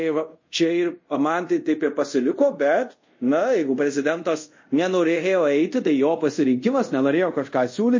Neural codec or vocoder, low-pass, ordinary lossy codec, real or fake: codec, 24 kHz, 0.5 kbps, DualCodec; 7.2 kHz; MP3, 32 kbps; fake